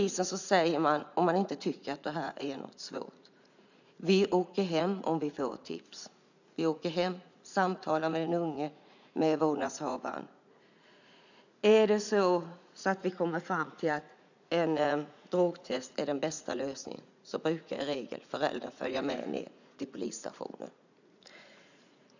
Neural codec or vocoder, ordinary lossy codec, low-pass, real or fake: vocoder, 44.1 kHz, 80 mel bands, Vocos; none; 7.2 kHz; fake